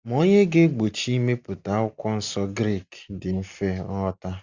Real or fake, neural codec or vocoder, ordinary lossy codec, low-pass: real; none; Opus, 64 kbps; 7.2 kHz